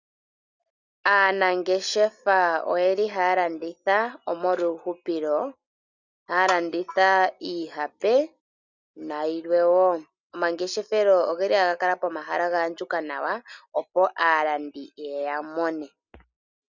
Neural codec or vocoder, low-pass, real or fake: none; 7.2 kHz; real